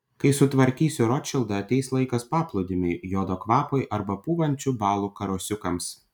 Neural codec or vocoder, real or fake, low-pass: none; real; 19.8 kHz